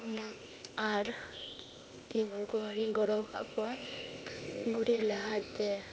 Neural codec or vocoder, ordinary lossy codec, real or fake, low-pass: codec, 16 kHz, 0.8 kbps, ZipCodec; none; fake; none